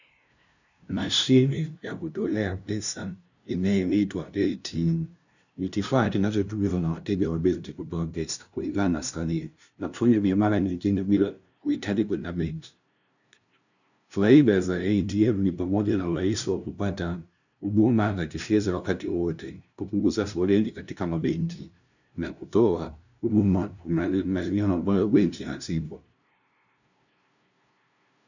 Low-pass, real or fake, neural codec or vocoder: 7.2 kHz; fake; codec, 16 kHz, 0.5 kbps, FunCodec, trained on LibriTTS, 25 frames a second